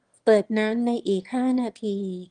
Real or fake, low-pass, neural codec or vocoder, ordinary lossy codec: fake; 9.9 kHz; autoencoder, 22.05 kHz, a latent of 192 numbers a frame, VITS, trained on one speaker; Opus, 24 kbps